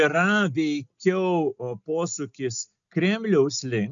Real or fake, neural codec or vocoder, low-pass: real; none; 7.2 kHz